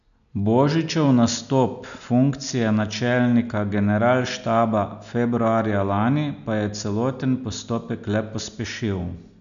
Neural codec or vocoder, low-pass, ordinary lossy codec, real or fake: none; 7.2 kHz; none; real